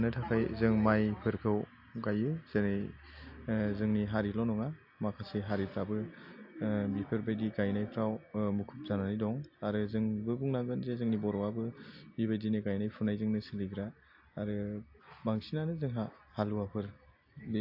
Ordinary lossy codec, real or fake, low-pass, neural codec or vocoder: AAC, 48 kbps; real; 5.4 kHz; none